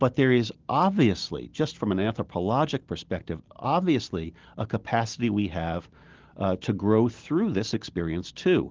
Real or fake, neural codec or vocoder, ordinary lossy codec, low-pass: real; none; Opus, 16 kbps; 7.2 kHz